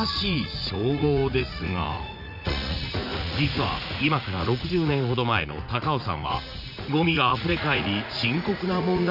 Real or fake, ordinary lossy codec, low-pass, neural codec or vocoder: fake; none; 5.4 kHz; vocoder, 44.1 kHz, 80 mel bands, Vocos